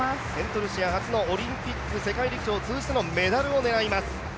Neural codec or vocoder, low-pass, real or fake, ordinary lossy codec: none; none; real; none